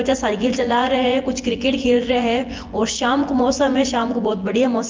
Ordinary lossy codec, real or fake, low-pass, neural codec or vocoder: Opus, 32 kbps; fake; 7.2 kHz; vocoder, 24 kHz, 100 mel bands, Vocos